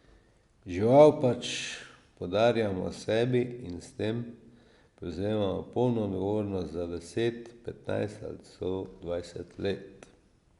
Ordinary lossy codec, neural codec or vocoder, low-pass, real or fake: Opus, 64 kbps; none; 10.8 kHz; real